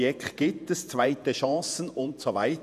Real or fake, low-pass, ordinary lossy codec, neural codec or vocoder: fake; 14.4 kHz; none; vocoder, 48 kHz, 128 mel bands, Vocos